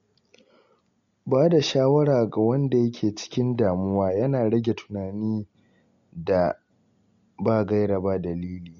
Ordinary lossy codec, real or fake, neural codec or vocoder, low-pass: MP3, 48 kbps; real; none; 7.2 kHz